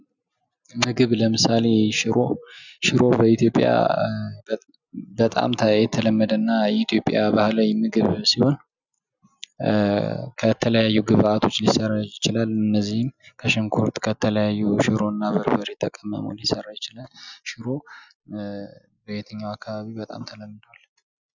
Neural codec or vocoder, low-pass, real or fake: none; 7.2 kHz; real